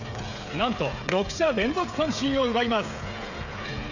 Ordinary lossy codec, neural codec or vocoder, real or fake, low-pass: none; codec, 16 kHz, 16 kbps, FreqCodec, smaller model; fake; 7.2 kHz